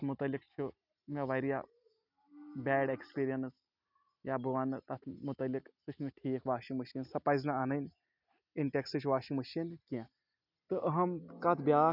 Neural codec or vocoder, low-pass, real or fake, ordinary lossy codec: none; 5.4 kHz; real; none